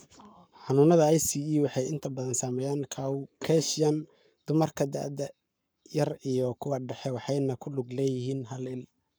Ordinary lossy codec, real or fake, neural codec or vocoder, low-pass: none; fake; codec, 44.1 kHz, 7.8 kbps, Pupu-Codec; none